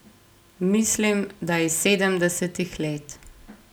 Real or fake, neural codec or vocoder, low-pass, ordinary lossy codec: real; none; none; none